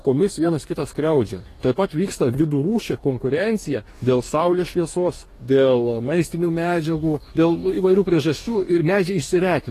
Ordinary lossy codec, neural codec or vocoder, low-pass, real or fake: AAC, 48 kbps; codec, 44.1 kHz, 2.6 kbps, DAC; 14.4 kHz; fake